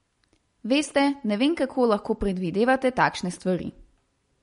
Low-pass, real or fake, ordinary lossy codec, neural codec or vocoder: 10.8 kHz; real; MP3, 48 kbps; none